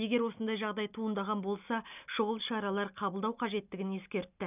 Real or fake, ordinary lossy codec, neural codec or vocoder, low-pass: real; none; none; 3.6 kHz